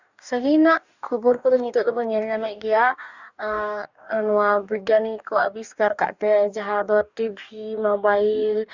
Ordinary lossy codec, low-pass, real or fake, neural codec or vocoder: Opus, 64 kbps; 7.2 kHz; fake; codec, 44.1 kHz, 2.6 kbps, DAC